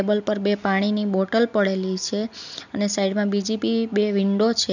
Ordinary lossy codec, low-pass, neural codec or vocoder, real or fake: none; 7.2 kHz; none; real